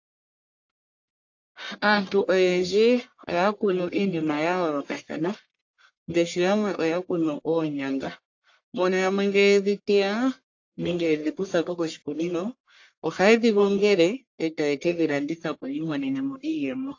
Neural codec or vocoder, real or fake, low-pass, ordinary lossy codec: codec, 44.1 kHz, 1.7 kbps, Pupu-Codec; fake; 7.2 kHz; AAC, 48 kbps